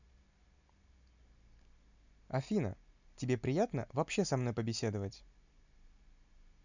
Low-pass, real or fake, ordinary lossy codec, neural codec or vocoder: 7.2 kHz; real; none; none